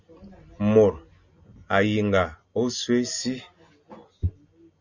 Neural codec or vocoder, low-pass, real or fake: none; 7.2 kHz; real